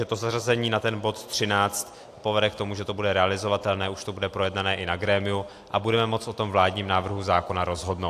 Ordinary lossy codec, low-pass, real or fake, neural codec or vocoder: AAC, 64 kbps; 14.4 kHz; real; none